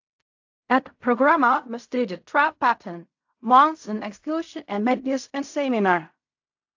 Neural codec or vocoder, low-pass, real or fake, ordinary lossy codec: codec, 16 kHz in and 24 kHz out, 0.4 kbps, LongCat-Audio-Codec, fine tuned four codebook decoder; 7.2 kHz; fake; AAC, 48 kbps